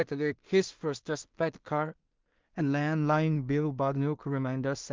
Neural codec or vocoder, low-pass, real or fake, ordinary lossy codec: codec, 16 kHz in and 24 kHz out, 0.4 kbps, LongCat-Audio-Codec, two codebook decoder; 7.2 kHz; fake; Opus, 32 kbps